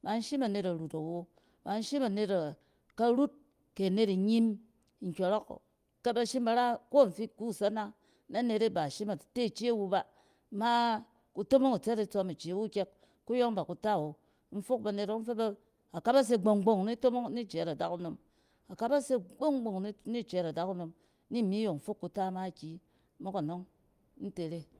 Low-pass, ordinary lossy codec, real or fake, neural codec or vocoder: 14.4 kHz; Opus, 32 kbps; real; none